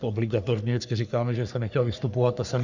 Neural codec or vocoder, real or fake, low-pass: codec, 44.1 kHz, 3.4 kbps, Pupu-Codec; fake; 7.2 kHz